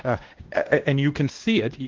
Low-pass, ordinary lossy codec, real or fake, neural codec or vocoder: 7.2 kHz; Opus, 16 kbps; fake; codec, 16 kHz, 1 kbps, X-Codec, WavLM features, trained on Multilingual LibriSpeech